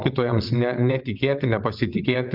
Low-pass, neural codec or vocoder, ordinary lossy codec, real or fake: 5.4 kHz; vocoder, 22.05 kHz, 80 mel bands, WaveNeXt; Opus, 64 kbps; fake